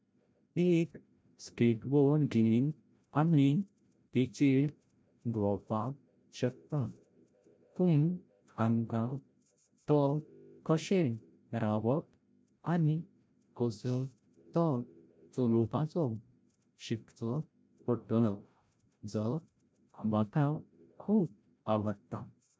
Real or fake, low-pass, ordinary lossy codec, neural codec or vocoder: fake; none; none; codec, 16 kHz, 0.5 kbps, FreqCodec, larger model